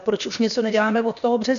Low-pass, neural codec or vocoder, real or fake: 7.2 kHz; codec, 16 kHz, about 1 kbps, DyCAST, with the encoder's durations; fake